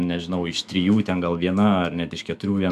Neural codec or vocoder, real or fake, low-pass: autoencoder, 48 kHz, 128 numbers a frame, DAC-VAE, trained on Japanese speech; fake; 14.4 kHz